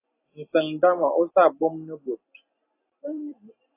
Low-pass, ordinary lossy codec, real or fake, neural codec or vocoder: 3.6 kHz; AAC, 24 kbps; fake; vocoder, 44.1 kHz, 128 mel bands every 256 samples, BigVGAN v2